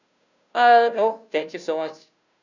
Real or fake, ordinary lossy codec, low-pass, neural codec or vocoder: fake; none; 7.2 kHz; codec, 16 kHz, 0.5 kbps, FunCodec, trained on Chinese and English, 25 frames a second